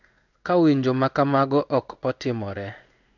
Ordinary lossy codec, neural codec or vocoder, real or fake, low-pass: none; codec, 16 kHz in and 24 kHz out, 1 kbps, XY-Tokenizer; fake; 7.2 kHz